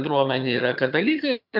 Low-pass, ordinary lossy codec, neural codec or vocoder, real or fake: 5.4 kHz; MP3, 48 kbps; vocoder, 22.05 kHz, 80 mel bands, HiFi-GAN; fake